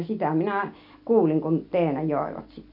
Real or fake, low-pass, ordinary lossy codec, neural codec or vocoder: real; 5.4 kHz; none; none